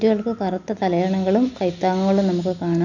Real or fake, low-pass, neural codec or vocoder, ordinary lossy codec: real; 7.2 kHz; none; none